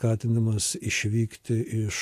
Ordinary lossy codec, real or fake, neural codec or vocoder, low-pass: AAC, 64 kbps; real; none; 14.4 kHz